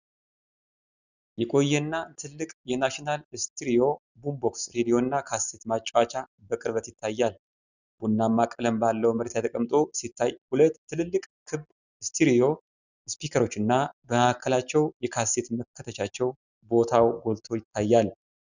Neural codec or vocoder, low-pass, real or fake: none; 7.2 kHz; real